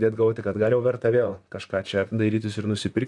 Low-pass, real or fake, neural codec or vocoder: 10.8 kHz; fake; vocoder, 44.1 kHz, 128 mel bands, Pupu-Vocoder